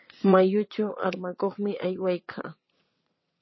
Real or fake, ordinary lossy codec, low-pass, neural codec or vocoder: fake; MP3, 24 kbps; 7.2 kHz; vocoder, 22.05 kHz, 80 mel bands, WaveNeXt